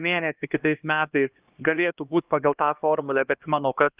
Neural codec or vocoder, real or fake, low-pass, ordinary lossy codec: codec, 16 kHz, 1 kbps, X-Codec, HuBERT features, trained on LibriSpeech; fake; 3.6 kHz; Opus, 24 kbps